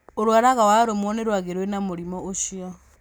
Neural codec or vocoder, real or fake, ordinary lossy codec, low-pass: none; real; none; none